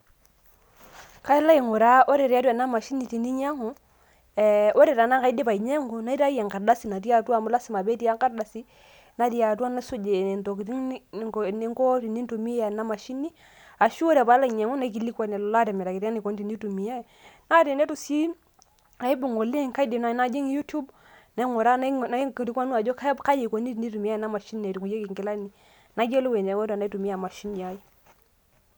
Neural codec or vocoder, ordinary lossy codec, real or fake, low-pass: none; none; real; none